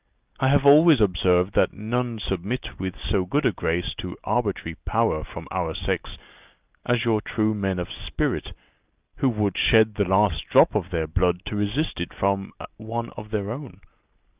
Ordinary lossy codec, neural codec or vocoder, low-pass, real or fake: Opus, 24 kbps; none; 3.6 kHz; real